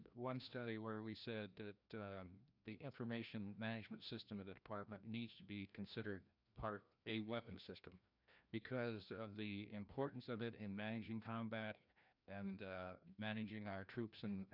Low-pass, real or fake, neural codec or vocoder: 5.4 kHz; fake; codec, 16 kHz, 1 kbps, FreqCodec, larger model